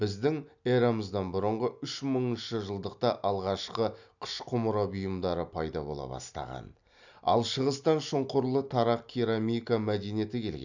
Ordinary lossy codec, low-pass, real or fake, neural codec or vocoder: none; 7.2 kHz; real; none